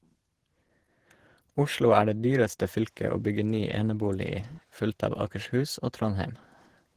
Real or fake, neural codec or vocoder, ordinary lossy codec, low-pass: fake; vocoder, 48 kHz, 128 mel bands, Vocos; Opus, 16 kbps; 14.4 kHz